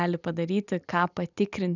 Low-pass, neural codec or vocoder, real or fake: 7.2 kHz; none; real